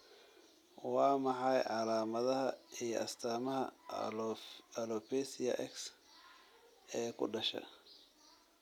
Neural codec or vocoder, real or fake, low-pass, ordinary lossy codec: none; real; 19.8 kHz; none